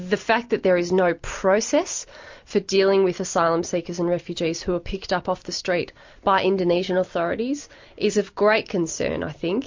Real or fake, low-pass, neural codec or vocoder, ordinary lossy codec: real; 7.2 kHz; none; MP3, 48 kbps